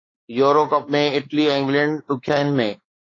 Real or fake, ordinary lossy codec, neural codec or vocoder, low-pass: fake; AAC, 32 kbps; codec, 16 kHz, 4 kbps, X-Codec, WavLM features, trained on Multilingual LibriSpeech; 7.2 kHz